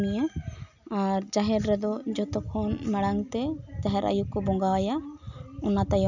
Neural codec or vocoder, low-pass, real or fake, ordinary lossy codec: none; 7.2 kHz; real; none